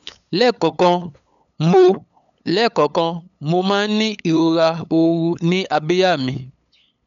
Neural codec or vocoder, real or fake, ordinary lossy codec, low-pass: codec, 16 kHz, 8 kbps, FunCodec, trained on LibriTTS, 25 frames a second; fake; none; 7.2 kHz